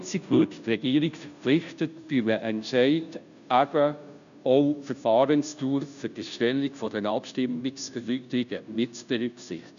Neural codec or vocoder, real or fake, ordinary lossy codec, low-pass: codec, 16 kHz, 0.5 kbps, FunCodec, trained on Chinese and English, 25 frames a second; fake; none; 7.2 kHz